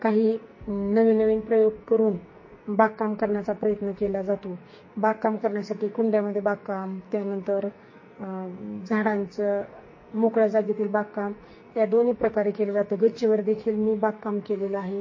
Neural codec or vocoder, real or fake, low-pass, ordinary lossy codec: codec, 44.1 kHz, 2.6 kbps, SNAC; fake; 7.2 kHz; MP3, 32 kbps